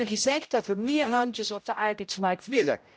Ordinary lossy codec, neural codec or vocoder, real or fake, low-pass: none; codec, 16 kHz, 0.5 kbps, X-Codec, HuBERT features, trained on general audio; fake; none